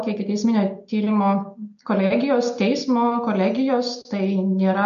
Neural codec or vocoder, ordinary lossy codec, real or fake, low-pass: none; MP3, 48 kbps; real; 7.2 kHz